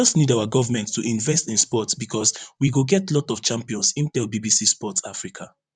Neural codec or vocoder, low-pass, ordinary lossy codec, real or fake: vocoder, 44.1 kHz, 128 mel bands, Pupu-Vocoder; 9.9 kHz; none; fake